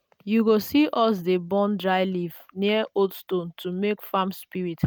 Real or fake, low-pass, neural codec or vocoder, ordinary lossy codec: real; none; none; none